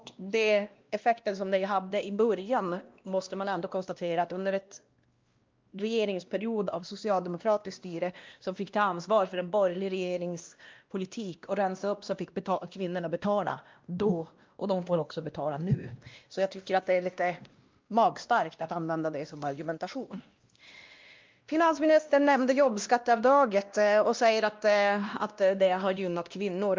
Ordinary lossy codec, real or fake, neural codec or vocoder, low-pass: Opus, 24 kbps; fake; codec, 16 kHz, 1 kbps, X-Codec, WavLM features, trained on Multilingual LibriSpeech; 7.2 kHz